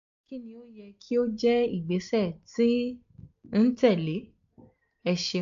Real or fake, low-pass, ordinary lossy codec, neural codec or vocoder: real; 7.2 kHz; AAC, 64 kbps; none